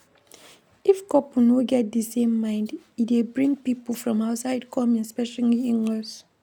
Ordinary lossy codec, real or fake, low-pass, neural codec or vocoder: none; real; 19.8 kHz; none